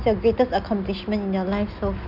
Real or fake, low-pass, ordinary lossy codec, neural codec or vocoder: real; 5.4 kHz; none; none